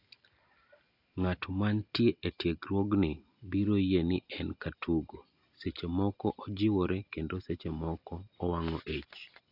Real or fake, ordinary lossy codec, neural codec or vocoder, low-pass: real; AAC, 48 kbps; none; 5.4 kHz